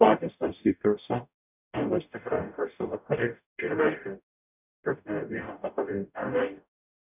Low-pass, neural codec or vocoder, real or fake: 3.6 kHz; codec, 44.1 kHz, 0.9 kbps, DAC; fake